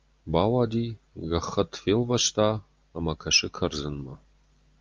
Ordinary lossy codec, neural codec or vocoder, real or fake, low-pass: Opus, 24 kbps; none; real; 7.2 kHz